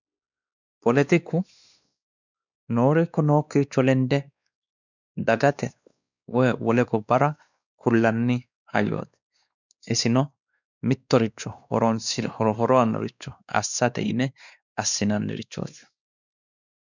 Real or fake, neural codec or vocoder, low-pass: fake; codec, 16 kHz, 2 kbps, X-Codec, WavLM features, trained on Multilingual LibriSpeech; 7.2 kHz